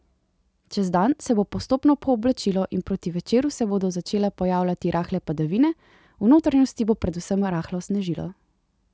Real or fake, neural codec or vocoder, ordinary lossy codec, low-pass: real; none; none; none